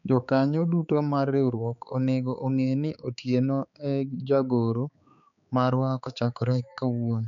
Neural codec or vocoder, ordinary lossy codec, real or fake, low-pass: codec, 16 kHz, 4 kbps, X-Codec, HuBERT features, trained on balanced general audio; none; fake; 7.2 kHz